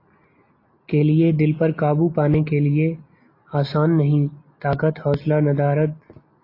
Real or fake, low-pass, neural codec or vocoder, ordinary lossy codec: real; 5.4 kHz; none; AAC, 32 kbps